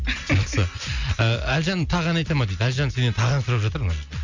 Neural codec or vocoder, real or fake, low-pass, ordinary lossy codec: none; real; 7.2 kHz; Opus, 64 kbps